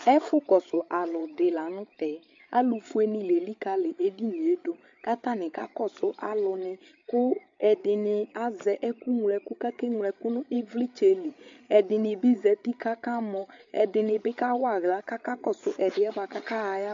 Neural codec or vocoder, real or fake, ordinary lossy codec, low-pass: codec, 16 kHz, 8 kbps, FreqCodec, larger model; fake; MP3, 48 kbps; 7.2 kHz